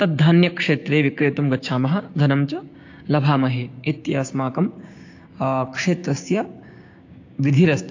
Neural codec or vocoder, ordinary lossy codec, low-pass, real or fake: codec, 16 kHz, 6 kbps, DAC; AAC, 48 kbps; 7.2 kHz; fake